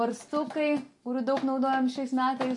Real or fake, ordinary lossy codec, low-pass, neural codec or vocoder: real; MP3, 48 kbps; 10.8 kHz; none